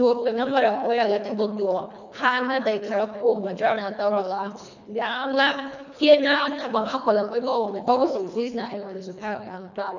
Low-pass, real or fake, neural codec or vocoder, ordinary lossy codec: 7.2 kHz; fake; codec, 24 kHz, 1.5 kbps, HILCodec; none